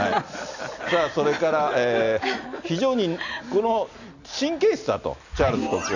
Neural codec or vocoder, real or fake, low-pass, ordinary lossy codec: none; real; 7.2 kHz; none